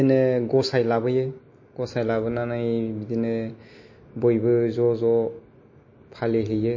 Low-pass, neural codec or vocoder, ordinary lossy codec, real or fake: 7.2 kHz; none; MP3, 32 kbps; real